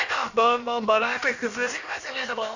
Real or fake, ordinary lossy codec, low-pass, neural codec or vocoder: fake; Opus, 64 kbps; 7.2 kHz; codec, 16 kHz, about 1 kbps, DyCAST, with the encoder's durations